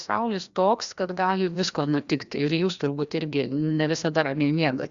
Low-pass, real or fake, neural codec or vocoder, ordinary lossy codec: 7.2 kHz; fake; codec, 16 kHz, 1 kbps, FreqCodec, larger model; Opus, 64 kbps